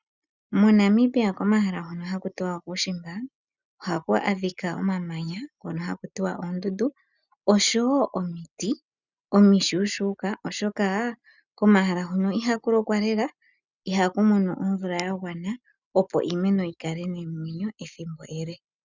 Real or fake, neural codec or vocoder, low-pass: real; none; 7.2 kHz